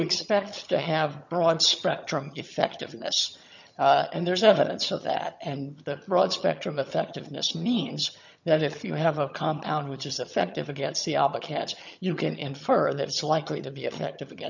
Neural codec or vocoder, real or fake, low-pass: vocoder, 22.05 kHz, 80 mel bands, HiFi-GAN; fake; 7.2 kHz